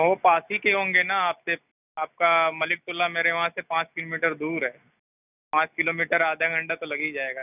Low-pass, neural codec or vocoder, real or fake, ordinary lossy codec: 3.6 kHz; none; real; none